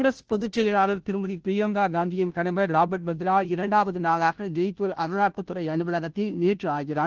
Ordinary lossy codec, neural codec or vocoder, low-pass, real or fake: Opus, 16 kbps; codec, 16 kHz, 0.5 kbps, FunCodec, trained on Chinese and English, 25 frames a second; 7.2 kHz; fake